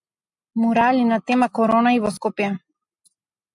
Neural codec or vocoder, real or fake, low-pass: none; real; 10.8 kHz